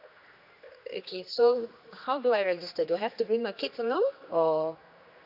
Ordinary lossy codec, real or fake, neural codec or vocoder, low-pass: none; fake; codec, 16 kHz, 2 kbps, X-Codec, HuBERT features, trained on general audio; 5.4 kHz